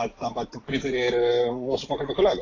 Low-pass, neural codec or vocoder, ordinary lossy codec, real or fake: 7.2 kHz; none; AAC, 32 kbps; real